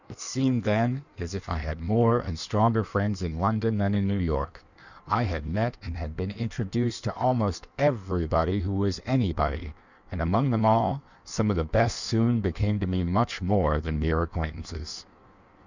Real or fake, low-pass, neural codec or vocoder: fake; 7.2 kHz; codec, 16 kHz in and 24 kHz out, 1.1 kbps, FireRedTTS-2 codec